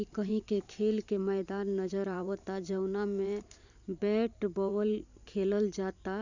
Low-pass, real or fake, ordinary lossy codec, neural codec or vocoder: 7.2 kHz; fake; none; vocoder, 44.1 kHz, 128 mel bands every 512 samples, BigVGAN v2